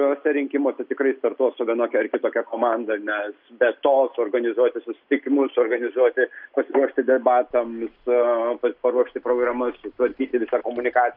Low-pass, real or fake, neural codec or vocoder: 5.4 kHz; real; none